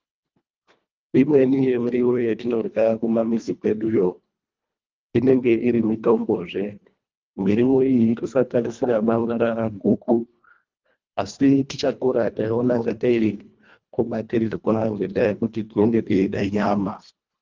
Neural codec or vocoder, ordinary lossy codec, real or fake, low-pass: codec, 24 kHz, 1.5 kbps, HILCodec; Opus, 24 kbps; fake; 7.2 kHz